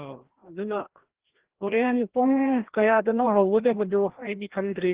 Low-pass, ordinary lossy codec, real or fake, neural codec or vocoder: 3.6 kHz; Opus, 16 kbps; fake; codec, 16 kHz, 1 kbps, FreqCodec, larger model